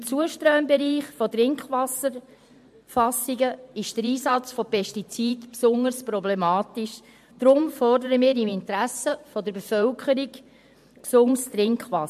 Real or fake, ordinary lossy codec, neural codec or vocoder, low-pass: fake; MP3, 64 kbps; vocoder, 44.1 kHz, 128 mel bands every 512 samples, BigVGAN v2; 14.4 kHz